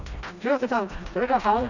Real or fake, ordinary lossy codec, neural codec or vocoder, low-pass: fake; none; codec, 16 kHz, 1 kbps, FreqCodec, smaller model; 7.2 kHz